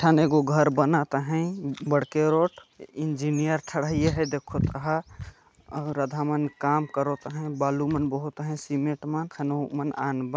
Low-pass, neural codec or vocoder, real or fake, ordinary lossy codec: none; none; real; none